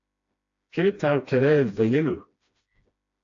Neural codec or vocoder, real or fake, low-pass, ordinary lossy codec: codec, 16 kHz, 1 kbps, FreqCodec, smaller model; fake; 7.2 kHz; AAC, 48 kbps